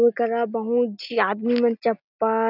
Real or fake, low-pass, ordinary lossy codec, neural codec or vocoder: real; 5.4 kHz; none; none